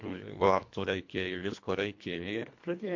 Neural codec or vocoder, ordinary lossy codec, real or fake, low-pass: codec, 24 kHz, 1.5 kbps, HILCodec; MP3, 48 kbps; fake; 7.2 kHz